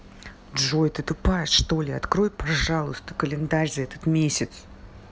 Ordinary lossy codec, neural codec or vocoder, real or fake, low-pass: none; none; real; none